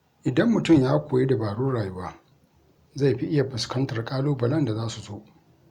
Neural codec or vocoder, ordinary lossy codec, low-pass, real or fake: none; Opus, 64 kbps; 19.8 kHz; real